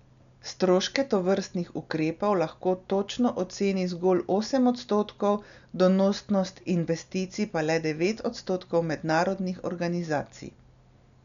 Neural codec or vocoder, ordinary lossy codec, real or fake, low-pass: none; MP3, 96 kbps; real; 7.2 kHz